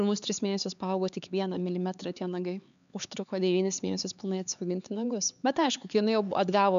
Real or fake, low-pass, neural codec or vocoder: fake; 7.2 kHz; codec, 16 kHz, 4 kbps, X-Codec, HuBERT features, trained on LibriSpeech